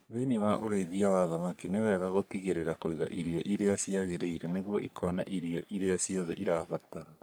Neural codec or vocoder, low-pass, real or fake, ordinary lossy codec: codec, 44.1 kHz, 3.4 kbps, Pupu-Codec; none; fake; none